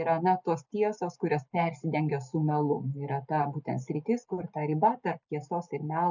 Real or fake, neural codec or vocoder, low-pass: real; none; 7.2 kHz